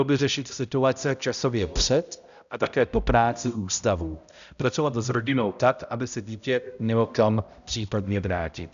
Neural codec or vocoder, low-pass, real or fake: codec, 16 kHz, 0.5 kbps, X-Codec, HuBERT features, trained on balanced general audio; 7.2 kHz; fake